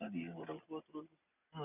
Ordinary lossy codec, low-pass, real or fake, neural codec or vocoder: none; 3.6 kHz; real; none